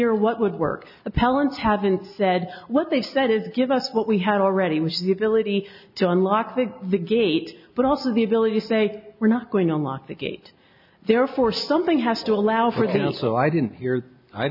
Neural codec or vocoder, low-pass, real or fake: none; 5.4 kHz; real